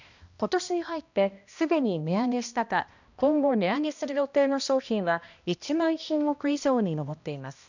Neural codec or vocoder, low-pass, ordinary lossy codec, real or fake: codec, 16 kHz, 1 kbps, X-Codec, HuBERT features, trained on balanced general audio; 7.2 kHz; none; fake